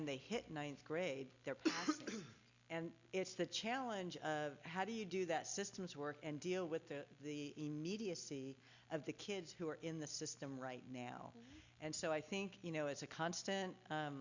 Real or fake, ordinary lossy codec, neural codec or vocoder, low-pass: real; Opus, 64 kbps; none; 7.2 kHz